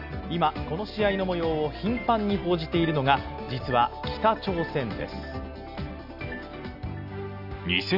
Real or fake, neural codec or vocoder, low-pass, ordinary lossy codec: real; none; 5.4 kHz; none